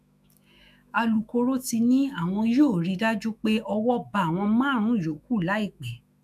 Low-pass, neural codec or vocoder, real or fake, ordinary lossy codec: 14.4 kHz; autoencoder, 48 kHz, 128 numbers a frame, DAC-VAE, trained on Japanese speech; fake; none